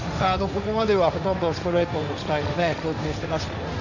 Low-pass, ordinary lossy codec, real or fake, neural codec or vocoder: 7.2 kHz; none; fake; codec, 16 kHz, 1.1 kbps, Voila-Tokenizer